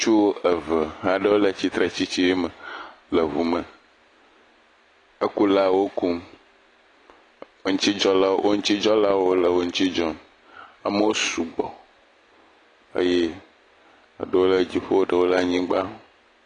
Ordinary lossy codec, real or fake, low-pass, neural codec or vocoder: AAC, 32 kbps; real; 10.8 kHz; none